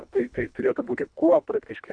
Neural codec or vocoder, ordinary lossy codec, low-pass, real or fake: codec, 24 kHz, 1.5 kbps, HILCodec; MP3, 96 kbps; 9.9 kHz; fake